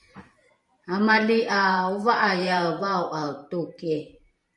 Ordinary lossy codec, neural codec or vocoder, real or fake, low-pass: MP3, 48 kbps; vocoder, 44.1 kHz, 128 mel bands every 512 samples, BigVGAN v2; fake; 10.8 kHz